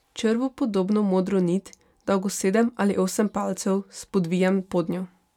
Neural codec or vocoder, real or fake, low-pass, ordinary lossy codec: none; real; 19.8 kHz; none